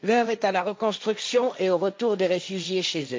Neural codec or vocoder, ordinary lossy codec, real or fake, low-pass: codec, 16 kHz, 1.1 kbps, Voila-Tokenizer; none; fake; none